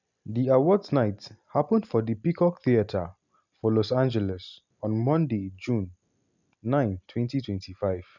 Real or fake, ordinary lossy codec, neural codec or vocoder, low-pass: real; none; none; 7.2 kHz